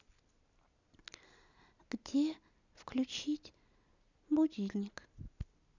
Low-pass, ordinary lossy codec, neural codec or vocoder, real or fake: 7.2 kHz; none; none; real